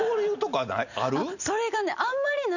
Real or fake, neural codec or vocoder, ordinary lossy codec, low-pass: real; none; none; 7.2 kHz